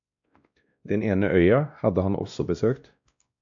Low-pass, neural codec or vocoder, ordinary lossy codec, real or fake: 7.2 kHz; codec, 16 kHz, 1 kbps, X-Codec, WavLM features, trained on Multilingual LibriSpeech; AAC, 64 kbps; fake